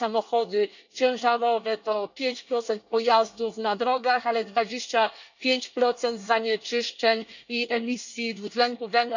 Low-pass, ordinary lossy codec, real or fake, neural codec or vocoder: 7.2 kHz; none; fake; codec, 24 kHz, 1 kbps, SNAC